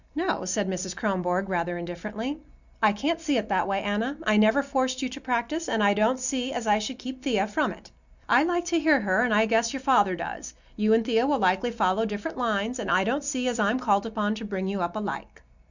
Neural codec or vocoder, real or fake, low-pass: none; real; 7.2 kHz